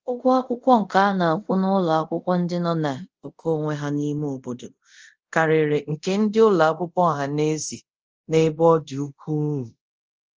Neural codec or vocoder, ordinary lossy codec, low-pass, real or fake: codec, 24 kHz, 0.5 kbps, DualCodec; Opus, 24 kbps; 7.2 kHz; fake